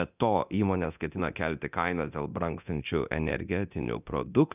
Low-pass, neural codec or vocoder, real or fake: 3.6 kHz; codec, 24 kHz, 0.9 kbps, DualCodec; fake